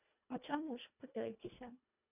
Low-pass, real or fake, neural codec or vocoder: 3.6 kHz; fake; codec, 24 kHz, 1.5 kbps, HILCodec